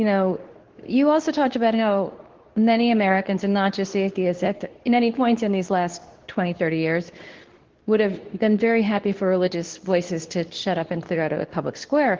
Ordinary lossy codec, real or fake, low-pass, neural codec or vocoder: Opus, 16 kbps; fake; 7.2 kHz; codec, 24 kHz, 0.9 kbps, WavTokenizer, medium speech release version 2